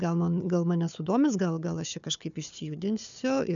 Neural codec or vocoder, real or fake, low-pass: codec, 16 kHz, 4 kbps, FunCodec, trained on Chinese and English, 50 frames a second; fake; 7.2 kHz